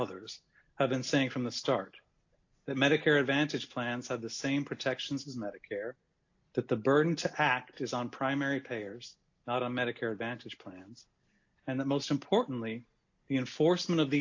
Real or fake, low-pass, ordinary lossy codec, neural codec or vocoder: real; 7.2 kHz; AAC, 48 kbps; none